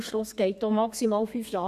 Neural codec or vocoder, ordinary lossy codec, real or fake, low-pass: codec, 32 kHz, 1.9 kbps, SNAC; none; fake; 14.4 kHz